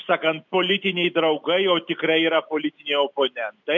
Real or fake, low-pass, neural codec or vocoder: real; 7.2 kHz; none